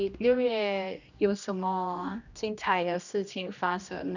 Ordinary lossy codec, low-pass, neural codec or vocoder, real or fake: none; 7.2 kHz; codec, 16 kHz, 1 kbps, X-Codec, HuBERT features, trained on general audio; fake